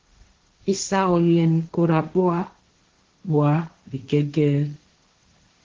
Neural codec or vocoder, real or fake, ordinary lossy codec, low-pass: codec, 16 kHz, 1.1 kbps, Voila-Tokenizer; fake; Opus, 16 kbps; 7.2 kHz